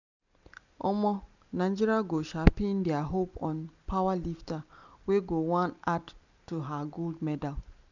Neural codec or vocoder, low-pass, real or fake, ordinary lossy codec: none; 7.2 kHz; real; none